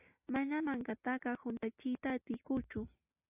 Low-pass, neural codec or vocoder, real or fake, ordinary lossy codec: 3.6 kHz; none; real; AAC, 24 kbps